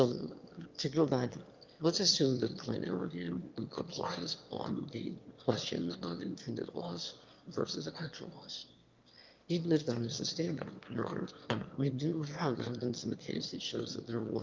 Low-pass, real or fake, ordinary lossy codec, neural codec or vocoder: 7.2 kHz; fake; Opus, 24 kbps; autoencoder, 22.05 kHz, a latent of 192 numbers a frame, VITS, trained on one speaker